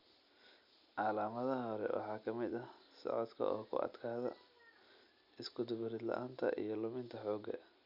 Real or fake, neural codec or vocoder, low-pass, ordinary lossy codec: real; none; 5.4 kHz; none